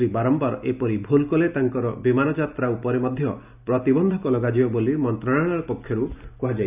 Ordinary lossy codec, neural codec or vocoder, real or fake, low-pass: none; none; real; 3.6 kHz